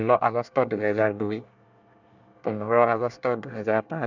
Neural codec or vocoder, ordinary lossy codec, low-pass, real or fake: codec, 24 kHz, 1 kbps, SNAC; none; 7.2 kHz; fake